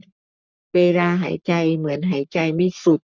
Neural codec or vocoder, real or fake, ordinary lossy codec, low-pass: codec, 44.1 kHz, 3.4 kbps, Pupu-Codec; fake; none; 7.2 kHz